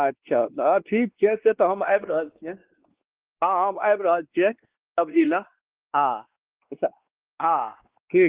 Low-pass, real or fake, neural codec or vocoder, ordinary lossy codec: 3.6 kHz; fake; codec, 16 kHz, 2 kbps, X-Codec, WavLM features, trained on Multilingual LibriSpeech; Opus, 16 kbps